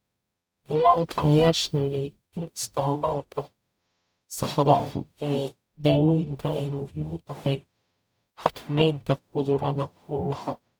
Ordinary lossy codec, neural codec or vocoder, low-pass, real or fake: none; codec, 44.1 kHz, 0.9 kbps, DAC; none; fake